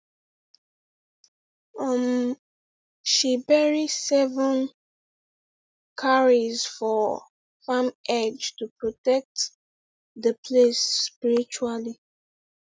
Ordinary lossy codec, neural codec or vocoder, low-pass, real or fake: none; none; none; real